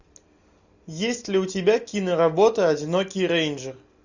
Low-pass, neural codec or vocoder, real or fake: 7.2 kHz; none; real